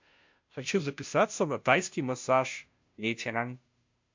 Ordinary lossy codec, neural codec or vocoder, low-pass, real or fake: MP3, 48 kbps; codec, 16 kHz, 0.5 kbps, FunCodec, trained on Chinese and English, 25 frames a second; 7.2 kHz; fake